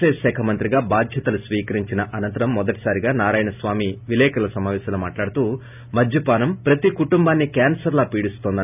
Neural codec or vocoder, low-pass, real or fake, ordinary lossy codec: none; 3.6 kHz; real; none